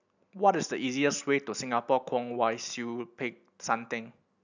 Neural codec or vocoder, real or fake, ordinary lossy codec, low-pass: none; real; none; 7.2 kHz